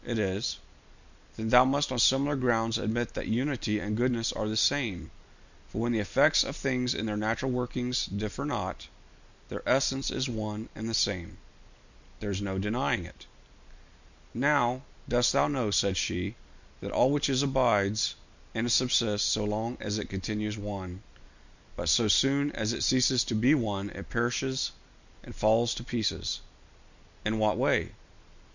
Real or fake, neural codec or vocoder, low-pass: real; none; 7.2 kHz